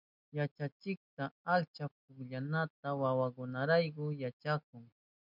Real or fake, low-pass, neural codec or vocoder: real; 5.4 kHz; none